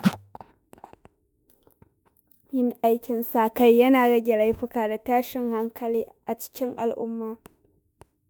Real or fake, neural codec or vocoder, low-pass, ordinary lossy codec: fake; autoencoder, 48 kHz, 32 numbers a frame, DAC-VAE, trained on Japanese speech; none; none